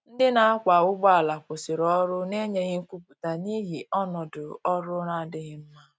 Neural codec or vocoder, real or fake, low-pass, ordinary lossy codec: none; real; none; none